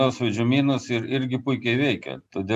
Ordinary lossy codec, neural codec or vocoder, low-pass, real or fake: MP3, 96 kbps; none; 14.4 kHz; real